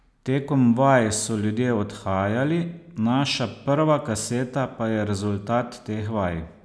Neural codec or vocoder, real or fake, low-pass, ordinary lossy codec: none; real; none; none